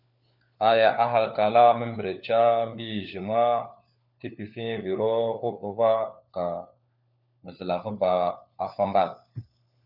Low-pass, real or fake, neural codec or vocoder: 5.4 kHz; fake; codec, 16 kHz, 4 kbps, FunCodec, trained on LibriTTS, 50 frames a second